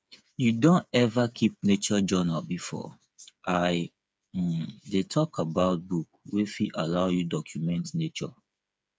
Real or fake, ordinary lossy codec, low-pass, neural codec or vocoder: fake; none; none; codec, 16 kHz, 8 kbps, FreqCodec, smaller model